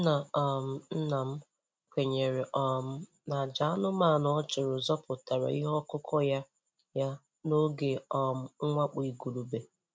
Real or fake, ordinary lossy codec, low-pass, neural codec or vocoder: real; none; none; none